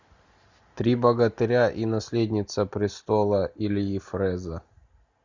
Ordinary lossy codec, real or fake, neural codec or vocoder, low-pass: Opus, 64 kbps; real; none; 7.2 kHz